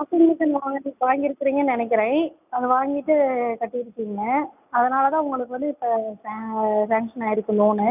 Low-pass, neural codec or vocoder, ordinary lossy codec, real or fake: 3.6 kHz; none; none; real